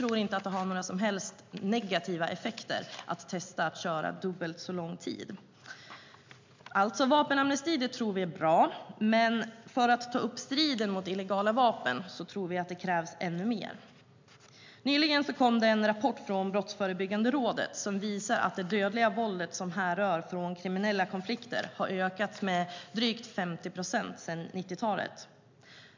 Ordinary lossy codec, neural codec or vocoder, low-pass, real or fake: MP3, 64 kbps; none; 7.2 kHz; real